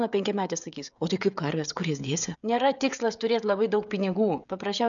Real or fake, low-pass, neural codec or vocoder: fake; 7.2 kHz; codec, 16 kHz, 16 kbps, FreqCodec, smaller model